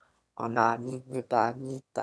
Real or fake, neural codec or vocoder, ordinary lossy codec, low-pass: fake; autoencoder, 22.05 kHz, a latent of 192 numbers a frame, VITS, trained on one speaker; none; none